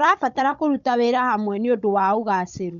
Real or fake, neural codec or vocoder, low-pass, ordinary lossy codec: fake; codec, 16 kHz, 16 kbps, FunCodec, trained on Chinese and English, 50 frames a second; 7.2 kHz; none